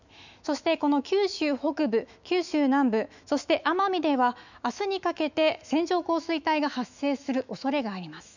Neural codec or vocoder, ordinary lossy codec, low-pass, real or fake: autoencoder, 48 kHz, 128 numbers a frame, DAC-VAE, trained on Japanese speech; none; 7.2 kHz; fake